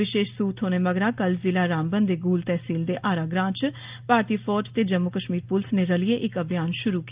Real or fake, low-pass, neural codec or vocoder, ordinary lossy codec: real; 3.6 kHz; none; Opus, 24 kbps